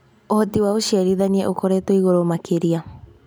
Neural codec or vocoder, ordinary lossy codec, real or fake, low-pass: none; none; real; none